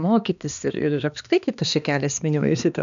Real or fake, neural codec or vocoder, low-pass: fake; codec, 16 kHz, 2 kbps, X-Codec, HuBERT features, trained on balanced general audio; 7.2 kHz